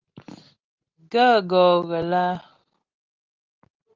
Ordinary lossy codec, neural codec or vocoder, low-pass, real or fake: Opus, 24 kbps; none; 7.2 kHz; real